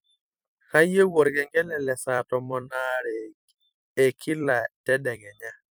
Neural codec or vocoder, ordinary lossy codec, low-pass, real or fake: vocoder, 44.1 kHz, 128 mel bands every 256 samples, BigVGAN v2; none; none; fake